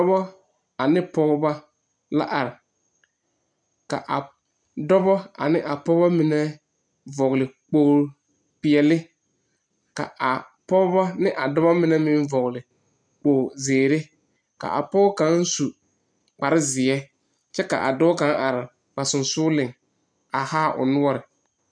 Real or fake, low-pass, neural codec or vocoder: real; 9.9 kHz; none